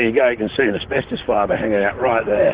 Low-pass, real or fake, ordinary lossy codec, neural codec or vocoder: 3.6 kHz; fake; Opus, 16 kbps; vocoder, 44.1 kHz, 128 mel bands, Pupu-Vocoder